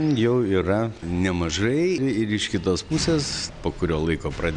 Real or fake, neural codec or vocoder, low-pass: real; none; 9.9 kHz